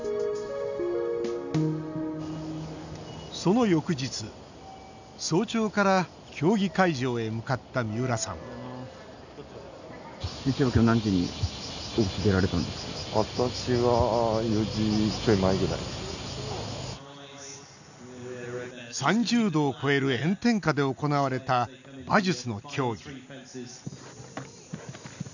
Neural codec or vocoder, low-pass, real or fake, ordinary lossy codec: none; 7.2 kHz; real; none